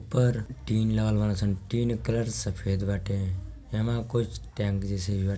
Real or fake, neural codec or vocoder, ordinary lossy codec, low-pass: real; none; none; none